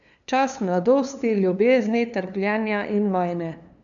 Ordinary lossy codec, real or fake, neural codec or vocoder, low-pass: none; fake; codec, 16 kHz, 2 kbps, FunCodec, trained on LibriTTS, 25 frames a second; 7.2 kHz